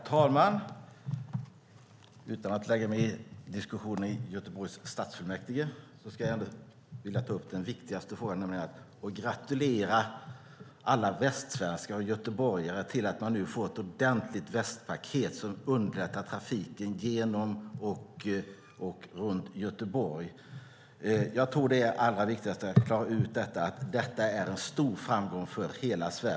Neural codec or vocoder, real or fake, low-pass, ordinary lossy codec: none; real; none; none